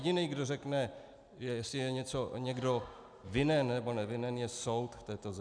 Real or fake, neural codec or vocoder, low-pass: real; none; 9.9 kHz